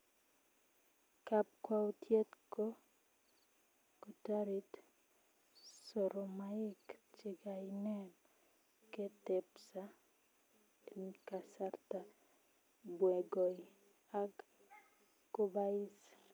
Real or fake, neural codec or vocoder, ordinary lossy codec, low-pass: real; none; none; none